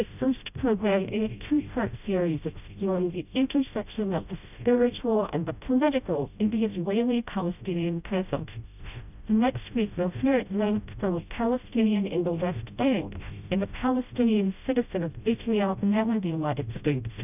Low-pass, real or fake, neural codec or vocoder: 3.6 kHz; fake; codec, 16 kHz, 0.5 kbps, FreqCodec, smaller model